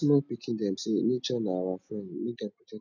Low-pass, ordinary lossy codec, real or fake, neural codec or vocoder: 7.2 kHz; none; real; none